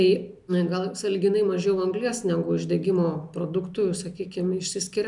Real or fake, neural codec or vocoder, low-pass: real; none; 10.8 kHz